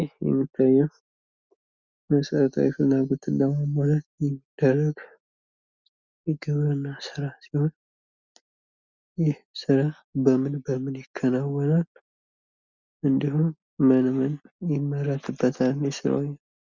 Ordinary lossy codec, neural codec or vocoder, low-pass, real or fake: Opus, 64 kbps; none; 7.2 kHz; real